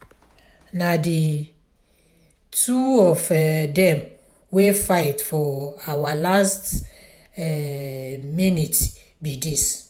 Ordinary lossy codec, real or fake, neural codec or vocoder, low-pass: none; fake; vocoder, 48 kHz, 128 mel bands, Vocos; none